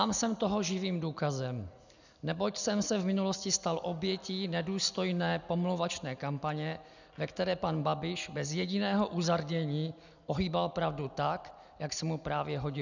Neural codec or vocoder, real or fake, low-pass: none; real; 7.2 kHz